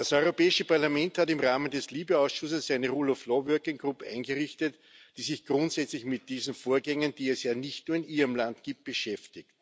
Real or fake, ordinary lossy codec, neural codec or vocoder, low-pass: real; none; none; none